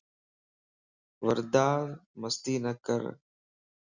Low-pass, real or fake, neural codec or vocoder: 7.2 kHz; real; none